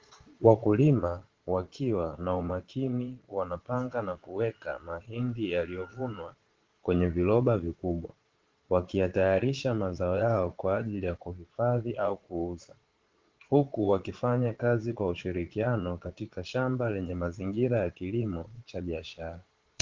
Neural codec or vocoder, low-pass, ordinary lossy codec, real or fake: vocoder, 22.05 kHz, 80 mel bands, Vocos; 7.2 kHz; Opus, 16 kbps; fake